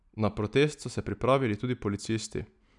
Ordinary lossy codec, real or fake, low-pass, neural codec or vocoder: none; real; 10.8 kHz; none